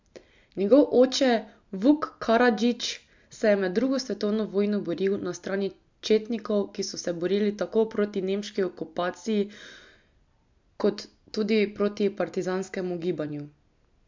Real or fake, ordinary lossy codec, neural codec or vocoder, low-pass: real; MP3, 64 kbps; none; 7.2 kHz